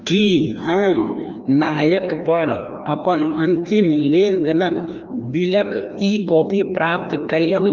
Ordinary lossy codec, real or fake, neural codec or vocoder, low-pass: Opus, 24 kbps; fake; codec, 16 kHz, 1 kbps, FreqCodec, larger model; 7.2 kHz